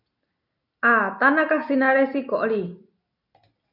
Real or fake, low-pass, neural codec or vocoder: real; 5.4 kHz; none